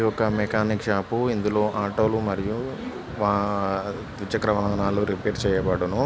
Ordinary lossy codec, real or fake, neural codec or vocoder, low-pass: none; real; none; none